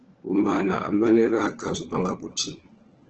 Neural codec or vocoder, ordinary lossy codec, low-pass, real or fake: codec, 16 kHz, 4 kbps, FunCodec, trained on LibriTTS, 50 frames a second; Opus, 16 kbps; 7.2 kHz; fake